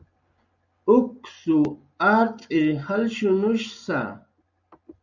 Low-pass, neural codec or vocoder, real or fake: 7.2 kHz; none; real